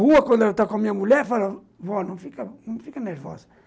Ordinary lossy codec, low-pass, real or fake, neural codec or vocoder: none; none; real; none